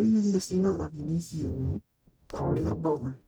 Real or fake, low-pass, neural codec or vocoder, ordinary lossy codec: fake; none; codec, 44.1 kHz, 0.9 kbps, DAC; none